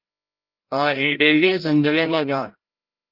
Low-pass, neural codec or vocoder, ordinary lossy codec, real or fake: 5.4 kHz; codec, 16 kHz, 0.5 kbps, FreqCodec, larger model; Opus, 32 kbps; fake